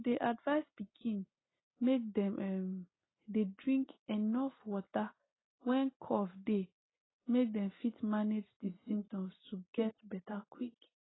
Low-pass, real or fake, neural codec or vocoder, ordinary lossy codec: 7.2 kHz; real; none; AAC, 16 kbps